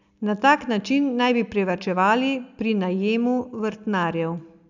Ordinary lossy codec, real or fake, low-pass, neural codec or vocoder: none; real; 7.2 kHz; none